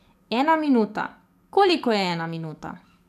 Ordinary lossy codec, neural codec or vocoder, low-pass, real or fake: none; codec, 44.1 kHz, 7.8 kbps, DAC; 14.4 kHz; fake